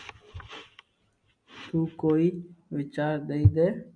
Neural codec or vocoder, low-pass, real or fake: none; 9.9 kHz; real